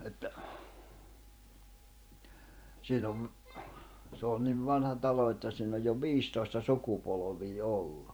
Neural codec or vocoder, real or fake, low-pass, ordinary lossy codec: vocoder, 44.1 kHz, 128 mel bands every 512 samples, BigVGAN v2; fake; none; none